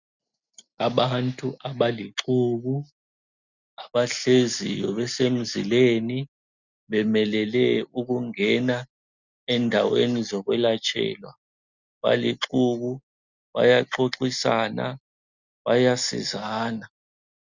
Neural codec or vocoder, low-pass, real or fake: none; 7.2 kHz; real